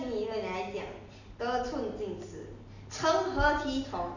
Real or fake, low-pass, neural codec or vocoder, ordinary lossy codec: real; 7.2 kHz; none; none